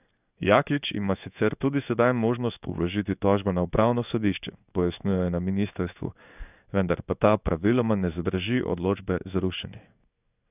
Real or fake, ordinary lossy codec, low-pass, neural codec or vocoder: fake; none; 3.6 kHz; codec, 16 kHz in and 24 kHz out, 1 kbps, XY-Tokenizer